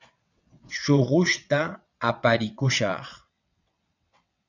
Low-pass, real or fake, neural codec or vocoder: 7.2 kHz; fake; vocoder, 22.05 kHz, 80 mel bands, WaveNeXt